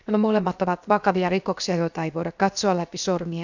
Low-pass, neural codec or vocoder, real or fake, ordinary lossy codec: 7.2 kHz; codec, 16 kHz, 0.7 kbps, FocalCodec; fake; none